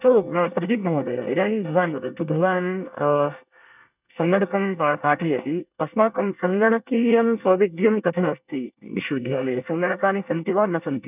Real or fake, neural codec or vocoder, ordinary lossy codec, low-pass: fake; codec, 24 kHz, 1 kbps, SNAC; none; 3.6 kHz